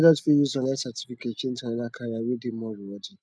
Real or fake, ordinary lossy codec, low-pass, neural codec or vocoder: real; none; none; none